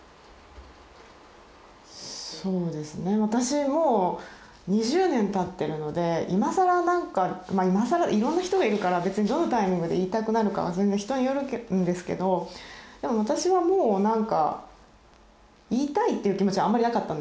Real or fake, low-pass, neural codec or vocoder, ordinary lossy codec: real; none; none; none